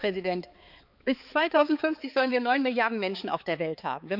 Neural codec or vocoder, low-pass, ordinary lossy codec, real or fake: codec, 16 kHz, 4 kbps, X-Codec, HuBERT features, trained on balanced general audio; 5.4 kHz; none; fake